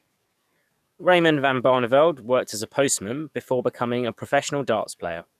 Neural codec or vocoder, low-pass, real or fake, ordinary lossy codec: codec, 44.1 kHz, 7.8 kbps, DAC; 14.4 kHz; fake; none